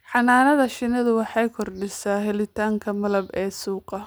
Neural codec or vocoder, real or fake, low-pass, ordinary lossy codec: none; real; none; none